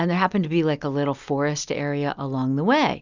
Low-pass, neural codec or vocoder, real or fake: 7.2 kHz; none; real